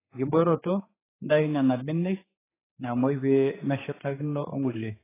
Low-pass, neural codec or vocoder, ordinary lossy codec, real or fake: 3.6 kHz; codec, 16 kHz, 4 kbps, X-Codec, HuBERT features, trained on general audio; AAC, 16 kbps; fake